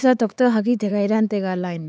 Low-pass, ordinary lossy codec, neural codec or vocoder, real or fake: none; none; codec, 16 kHz, 4 kbps, X-Codec, HuBERT features, trained on LibriSpeech; fake